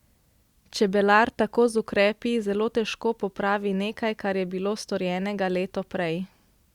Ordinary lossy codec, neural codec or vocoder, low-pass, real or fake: Opus, 64 kbps; none; 19.8 kHz; real